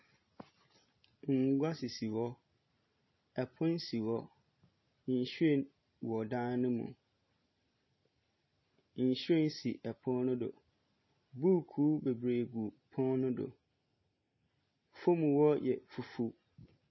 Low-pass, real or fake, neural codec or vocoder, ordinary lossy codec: 7.2 kHz; real; none; MP3, 24 kbps